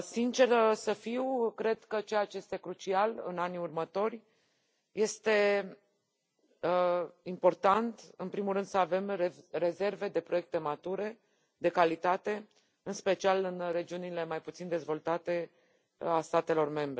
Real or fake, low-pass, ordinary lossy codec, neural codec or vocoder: real; none; none; none